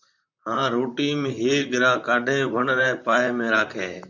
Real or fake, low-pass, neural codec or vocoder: fake; 7.2 kHz; vocoder, 44.1 kHz, 128 mel bands, Pupu-Vocoder